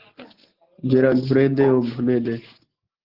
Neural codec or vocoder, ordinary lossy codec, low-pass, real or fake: none; Opus, 16 kbps; 5.4 kHz; real